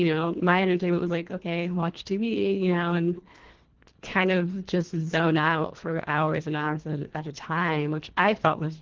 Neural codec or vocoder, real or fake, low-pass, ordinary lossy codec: codec, 24 kHz, 1.5 kbps, HILCodec; fake; 7.2 kHz; Opus, 16 kbps